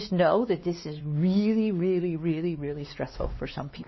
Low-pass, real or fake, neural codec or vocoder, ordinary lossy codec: 7.2 kHz; fake; codec, 16 kHz, 2 kbps, X-Codec, WavLM features, trained on Multilingual LibriSpeech; MP3, 24 kbps